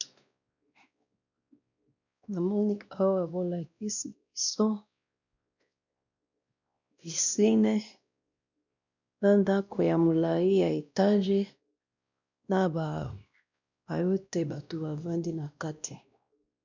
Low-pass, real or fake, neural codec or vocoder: 7.2 kHz; fake; codec, 16 kHz, 1 kbps, X-Codec, WavLM features, trained on Multilingual LibriSpeech